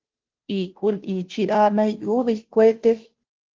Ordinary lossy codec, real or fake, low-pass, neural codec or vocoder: Opus, 16 kbps; fake; 7.2 kHz; codec, 16 kHz, 0.5 kbps, FunCodec, trained on Chinese and English, 25 frames a second